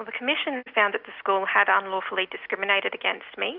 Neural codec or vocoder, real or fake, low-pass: none; real; 5.4 kHz